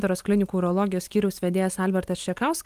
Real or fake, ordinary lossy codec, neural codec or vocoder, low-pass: real; Opus, 32 kbps; none; 14.4 kHz